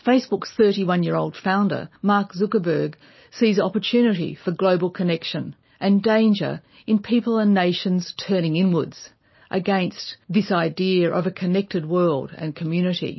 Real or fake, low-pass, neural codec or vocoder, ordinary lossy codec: real; 7.2 kHz; none; MP3, 24 kbps